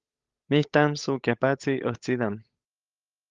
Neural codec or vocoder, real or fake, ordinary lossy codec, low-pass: codec, 16 kHz, 8 kbps, FunCodec, trained on Chinese and English, 25 frames a second; fake; Opus, 32 kbps; 7.2 kHz